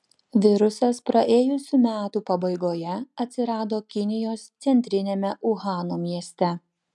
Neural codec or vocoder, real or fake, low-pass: none; real; 10.8 kHz